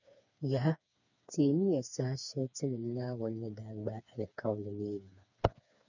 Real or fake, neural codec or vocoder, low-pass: fake; codec, 16 kHz, 4 kbps, FreqCodec, smaller model; 7.2 kHz